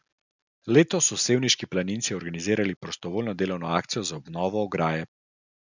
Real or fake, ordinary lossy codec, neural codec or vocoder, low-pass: real; none; none; 7.2 kHz